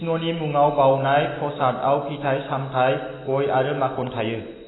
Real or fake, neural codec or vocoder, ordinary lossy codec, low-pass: real; none; AAC, 16 kbps; 7.2 kHz